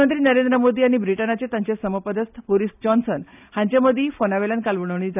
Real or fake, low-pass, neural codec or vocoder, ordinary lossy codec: real; 3.6 kHz; none; none